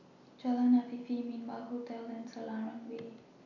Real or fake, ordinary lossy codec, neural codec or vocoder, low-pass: real; none; none; 7.2 kHz